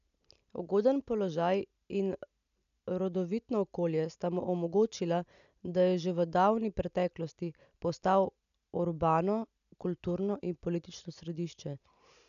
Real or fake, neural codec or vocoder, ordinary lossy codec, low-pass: real; none; none; 7.2 kHz